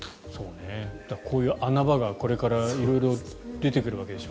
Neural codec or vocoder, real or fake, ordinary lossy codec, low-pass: none; real; none; none